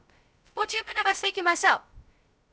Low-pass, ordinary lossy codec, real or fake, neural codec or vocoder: none; none; fake; codec, 16 kHz, 0.2 kbps, FocalCodec